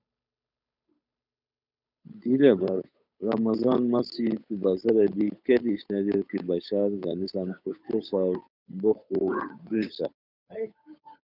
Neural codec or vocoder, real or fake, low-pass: codec, 16 kHz, 8 kbps, FunCodec, trained on Chinese and English, 25 frames a second; fake; 5.4 kHz